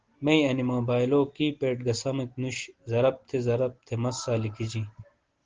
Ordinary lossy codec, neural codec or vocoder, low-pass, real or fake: Opus, 16 kbps; none; 7.2 kHz; real